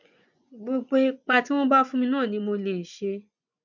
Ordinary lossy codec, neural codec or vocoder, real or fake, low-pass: none; vocoder, 24 kHz, 100 mel bands, Vocos; fake; 7.2 kHz